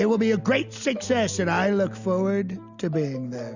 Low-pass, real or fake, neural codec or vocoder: 7.2 kHz; real; none